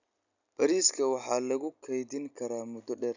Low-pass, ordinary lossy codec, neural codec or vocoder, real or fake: 7.2 kHz; none; none; real